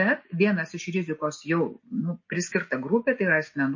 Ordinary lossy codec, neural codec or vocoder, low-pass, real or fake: MP3, 32 kbps; none; 7.2 kHz; real